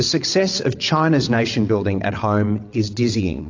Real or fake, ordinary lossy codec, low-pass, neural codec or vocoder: fake; AAC, 48 kbps; 7.2 kHz; vocoder, 22.05 kHz, 80 mel bands, WaveNeXt